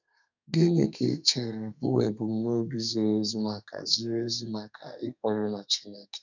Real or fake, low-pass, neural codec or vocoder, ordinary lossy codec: fake; 7.2 kHz; codec, 32 kHz, 1.9 kbps, SNAC; none